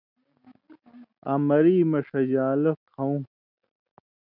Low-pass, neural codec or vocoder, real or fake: 5.4 kHz; none; real